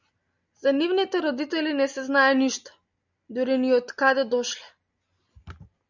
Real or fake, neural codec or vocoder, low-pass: real; none; 7.2 kHz